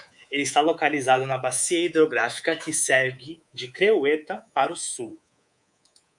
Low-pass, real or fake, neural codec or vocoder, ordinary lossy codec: 10.8 kHz; fake; codec, 24 kHz, 3.1 kbps, DualCodec; MP3, 96 kbps